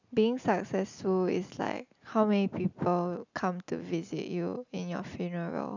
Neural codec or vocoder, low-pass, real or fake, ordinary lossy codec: none; 7.2 kHz; real; none